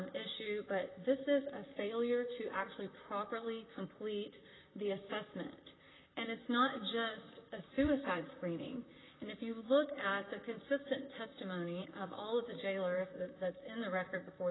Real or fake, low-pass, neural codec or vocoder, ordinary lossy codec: fake; 7.2 kHz; vocoder, 44.1 kHz, 128 mel bands, Pupu-Vocoder; AAC, 16 kbps